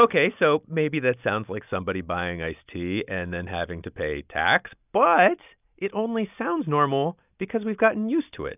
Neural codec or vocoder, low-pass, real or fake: none; 3.6 kHz; real